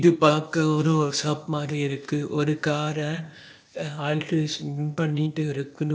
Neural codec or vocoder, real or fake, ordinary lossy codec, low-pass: codec, 16 kHz, 0.8 kbps, ZipCodec; fake; none; none